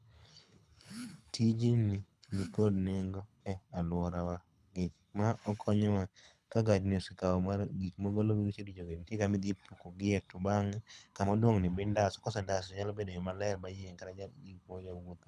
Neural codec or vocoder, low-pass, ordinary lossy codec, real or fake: codec, 24 kHz, 6 kbps, HILCodec; none; none; fake